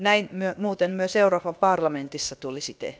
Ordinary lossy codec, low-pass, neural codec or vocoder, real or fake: none; none; codec, 16 kHz, about 1 kbps, DyCAST, with the encoder's durations; fake